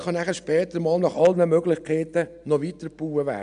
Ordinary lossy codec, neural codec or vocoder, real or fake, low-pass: none; none; real; 9.9 kHz